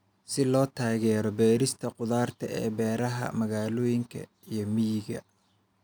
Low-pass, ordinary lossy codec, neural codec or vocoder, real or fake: none; none; none; real